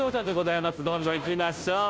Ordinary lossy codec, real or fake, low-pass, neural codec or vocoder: none; fake; none; codec, 16 kHz, 0.5 kbps, FunCodec, trained on Chinese and English, 25 frames a second